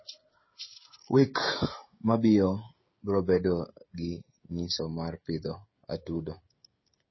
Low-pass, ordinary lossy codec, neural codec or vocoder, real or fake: 7.2 kHz; MP3, 24 kbps; codec, 16 kHz, 16 kbps, FreqCodec, smaller model; fake